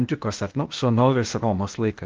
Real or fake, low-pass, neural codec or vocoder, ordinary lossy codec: fake; 7.2 kHz; codec, 16 kHz, 0.8 kbps, ZipCodec; Opus, 32 kbps